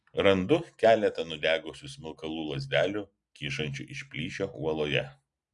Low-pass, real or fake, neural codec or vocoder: 10.8 kHz; real; none